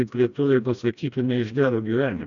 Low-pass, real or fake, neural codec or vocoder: 7.2 kHz; fake; codec, 16 kHz, 1 kbps, FreqCodec, smaller model